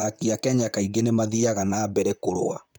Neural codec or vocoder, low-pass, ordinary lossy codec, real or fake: vocoder, 44.1 kHz, 128 mel bands, Pupu-Vocoder; none; none; fake